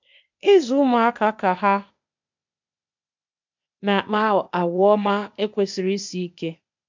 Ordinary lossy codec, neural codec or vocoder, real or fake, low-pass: MP3, 64 kbps; codec, 16 kHz, 0.8 kbps, ZipCodec; fake; 7.2 kHz